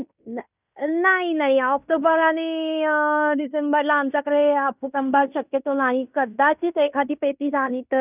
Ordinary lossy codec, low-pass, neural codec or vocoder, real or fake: none; 3.6 kHz; codec, 16 kHz in and 24 kHz out, 0.9 kbps, LongCat-Audio-Codec, fine tuned four codebook decoder; fake